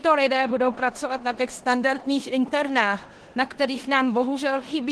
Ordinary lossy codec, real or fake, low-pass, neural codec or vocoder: Opus, 16 kbps; fake; 10.8 kHz; codec, 16 kHz in and 24 kHz out, 0.9 kbps, LongCat-Audio-Codec, four codebook decoder